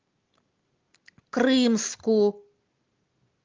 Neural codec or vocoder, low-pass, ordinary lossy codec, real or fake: none; 7.2 kHz; Opus, 32 kbps; real